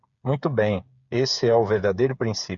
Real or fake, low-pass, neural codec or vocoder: fake; 7.2 kHz; codec, 16 kHz, 8 kbps, FreqCodec, smaller model